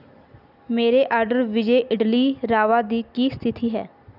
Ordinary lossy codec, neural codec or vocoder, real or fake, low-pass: none; none; real; 5.4 kHz